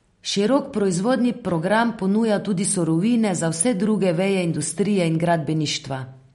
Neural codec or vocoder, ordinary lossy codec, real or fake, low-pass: none; MP3, 48 kbps; real; 19.8 kHz